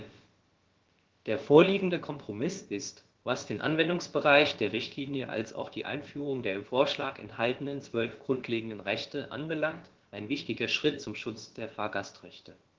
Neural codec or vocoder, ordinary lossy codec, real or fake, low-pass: codec, 16 kHz, about 1 kbps, DyCAST, with the encoder's durations; Opus, 16 kbps; fake; 7.2 kHz